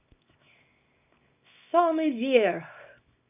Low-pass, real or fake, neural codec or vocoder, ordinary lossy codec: 3.6 kHz; fake; codec, 24 kHz, 0.9 kbps, WavTokenizer, small release; none